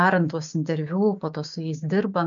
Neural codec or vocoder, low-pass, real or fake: codec, 16 kHz, 6 kbps, DAC; 7.2 kHz; fake